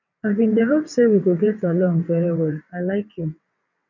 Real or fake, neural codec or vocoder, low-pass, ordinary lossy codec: fake; vocoder, 22.05 kHz, 80 mel bands, WaveNeXt; 7.2 kHz; none